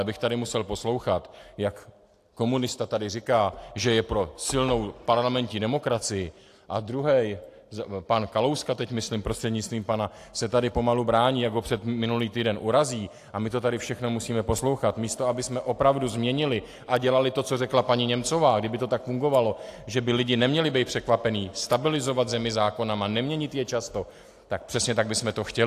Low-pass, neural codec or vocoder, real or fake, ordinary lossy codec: 14.4 kHz; none; real; AAC, 64 kbps